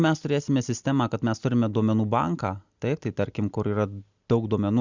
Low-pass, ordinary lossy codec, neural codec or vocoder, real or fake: 7.2 kHz; Opus, 64 kbps; none; real